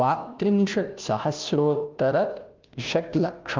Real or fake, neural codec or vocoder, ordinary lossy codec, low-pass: fake; codec, 16 kHz, 0.5 kbps, FunCodec, trained on Chinese and English, 25 frames a second; Opus, 24 kbps; 7.2 kHz